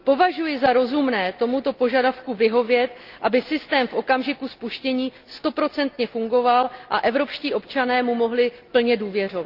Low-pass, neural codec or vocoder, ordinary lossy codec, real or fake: 5.4 kHz; none; Opus, 32 kbps; real